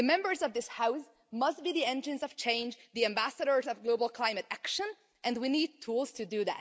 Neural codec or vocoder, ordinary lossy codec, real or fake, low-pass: none; none; real; none